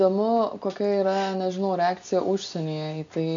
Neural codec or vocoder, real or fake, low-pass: none; real; 7.2 kHz